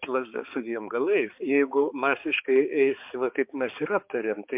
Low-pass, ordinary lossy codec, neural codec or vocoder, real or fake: 3.6 kHz; MP3, 32 kbps; codec, 16 kHz, 4 kbps, X-Codec, HuBERT features, trained on balanced general audio; fake